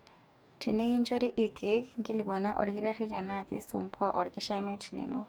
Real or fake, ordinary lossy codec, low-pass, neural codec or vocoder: fake; none; none; codec, 44.1 kHz, 2.6 kbps, DAC